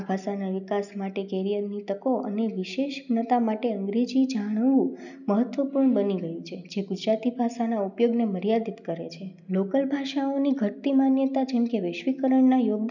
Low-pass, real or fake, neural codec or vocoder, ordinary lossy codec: 7.2 kHz; real; none; none